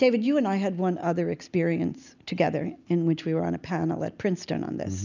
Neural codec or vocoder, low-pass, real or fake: none; 7.2 kHz; real